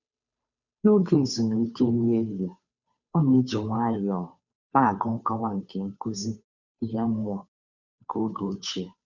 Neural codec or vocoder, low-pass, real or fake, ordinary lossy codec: codec, 16 kHz, 2 kbps, FunCodec, trained on Chinese and English, 25 frames a second; 7.2 kHz; fake; none